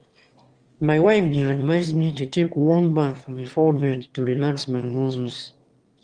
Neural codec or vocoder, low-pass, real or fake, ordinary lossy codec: autoencoder, 22.05 kHz, a latent of 192 numbers a frame, VITS, trained on one speaker; 9.9 kHz; fake; Opus, 24 kbps